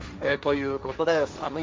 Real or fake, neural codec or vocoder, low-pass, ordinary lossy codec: fake; codec, 16 kHz, 1.1 kbps, Voila-Tokenizer; none; none